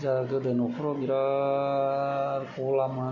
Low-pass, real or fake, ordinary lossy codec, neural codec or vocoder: 7.2 kHz; real; none; none